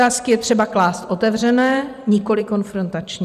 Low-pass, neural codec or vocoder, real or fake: 14.4 kHz; vocoder, 44.1 kHz, 128 mel bands every 512 samples, BigVGAN v2; fake